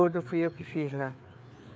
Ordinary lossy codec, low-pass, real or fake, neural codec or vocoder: none; none; fake; codec, 16 kHz, 4 kbps, FreqCodec, larger model